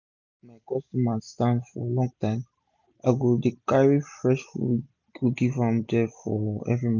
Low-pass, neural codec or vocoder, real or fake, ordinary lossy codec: 7.2 kHz; none; real; none